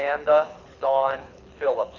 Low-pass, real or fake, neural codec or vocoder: 7.2 kHz; fake; codec, 24 kHz, 6 kbps, HILCodec